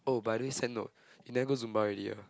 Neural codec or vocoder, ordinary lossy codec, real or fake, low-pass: none; none; real; none